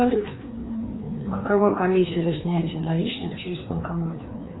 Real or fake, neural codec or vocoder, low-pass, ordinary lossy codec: fake; codec, 16 kHz, 2 kbps, FreqCodec, larger model; 7.2 kHz; AAC, 16 kbps